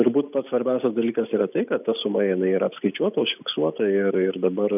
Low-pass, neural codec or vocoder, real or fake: 3.6 kHz; none; real